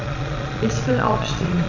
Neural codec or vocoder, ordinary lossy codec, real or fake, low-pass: vocoder, 22.05 kHz, 80 mel bands, Vocos; none; fake; 7.2 kHz